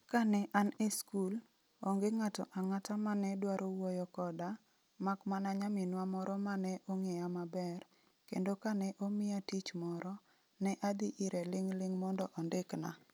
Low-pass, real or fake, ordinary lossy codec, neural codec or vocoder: none; real; none; none